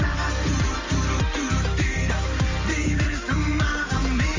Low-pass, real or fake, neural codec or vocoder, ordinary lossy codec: 7.2 kHz; real; none; Opus, 32 kbps